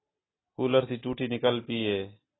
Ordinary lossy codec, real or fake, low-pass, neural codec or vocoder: AAC, 16 kbps; real; 7.2 kHz; none